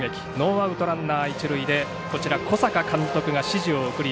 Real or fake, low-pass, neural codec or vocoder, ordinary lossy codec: real; none; none; none